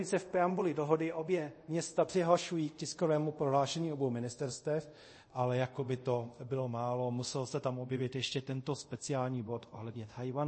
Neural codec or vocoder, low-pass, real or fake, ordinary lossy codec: codec, 24 kHz, 0.5 kbps, DualCodec; 9.9 kHz; fake; MP3, 32 kbps